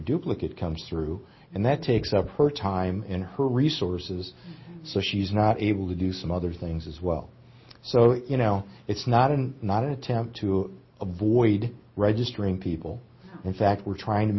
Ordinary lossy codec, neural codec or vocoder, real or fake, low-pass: MP3, 24 kbps; none; real; 7.2 kHz